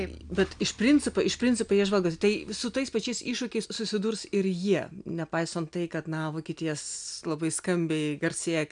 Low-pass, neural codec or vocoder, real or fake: 9.9 kHz; none; real